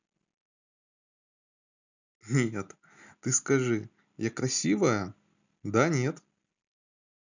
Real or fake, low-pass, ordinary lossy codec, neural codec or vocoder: real; 7.2 kHz; none; none